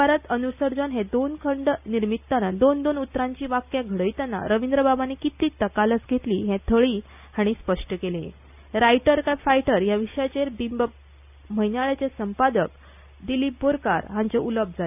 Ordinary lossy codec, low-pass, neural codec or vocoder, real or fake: none; 3.6 kHz; none; real